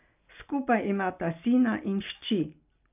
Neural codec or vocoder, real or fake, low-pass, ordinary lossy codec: none; real; 3.6 kHz; none